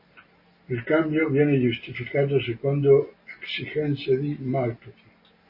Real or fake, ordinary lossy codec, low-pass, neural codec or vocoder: real; MP3, 24 kbps; 5.4 kHz; none